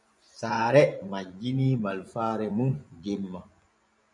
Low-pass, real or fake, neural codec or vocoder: 10.8 kHz; fake; vocoder, 24 kHz, 100 mel bands, Vocos